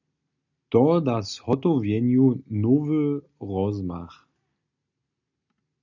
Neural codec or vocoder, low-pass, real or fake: none; 7.2 kHz; real